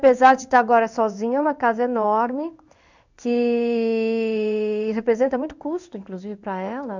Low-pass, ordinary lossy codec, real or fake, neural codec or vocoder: 7.2 kHz; none; fake; codec, 16 kHz in and 24 kHz out, 1 kbps, XY-Tokenizer